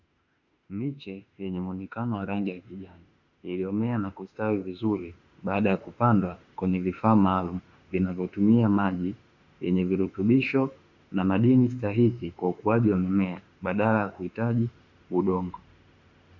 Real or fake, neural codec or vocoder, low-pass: fake; autoencoder, 48 kHz, 32 numbers a frame, DAC-VAE, trained on Japanese speech; 7.2 kHz